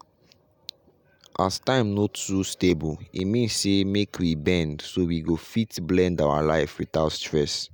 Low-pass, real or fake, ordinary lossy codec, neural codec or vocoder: none; real; none; none